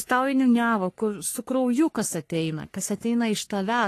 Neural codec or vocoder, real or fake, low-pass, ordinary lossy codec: codec, 44.1 kHz, 3.4 kbps, Pupu-Codec; fake; 14.4 kHz; AAC, 48 kbps